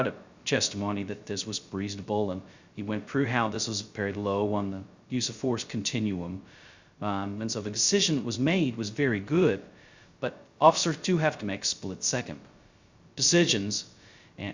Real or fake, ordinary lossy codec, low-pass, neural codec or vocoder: fake; Opus, 64 kbps; 7.2 kHz; codec, 16 kHz, 0.2 kbps, FocalCodec